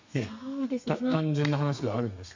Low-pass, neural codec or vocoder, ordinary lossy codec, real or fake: 7.2 kHz; codec, 32 kHz, 1.9 kbps, SNAC; MP3, 64 kbps; fake